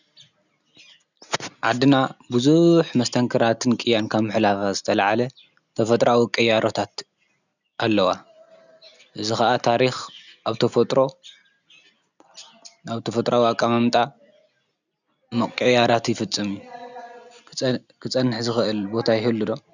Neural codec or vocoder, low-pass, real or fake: none; 7.2 kHz; real